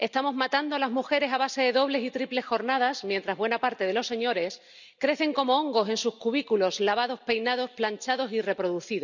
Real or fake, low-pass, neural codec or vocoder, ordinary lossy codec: real; 7.2 kHz; none; none